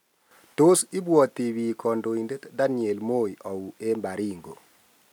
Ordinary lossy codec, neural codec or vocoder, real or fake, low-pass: none; none; real; none